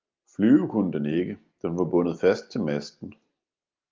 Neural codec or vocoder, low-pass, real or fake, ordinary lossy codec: none; 7.2 kHz; real; Opus, 24 kbps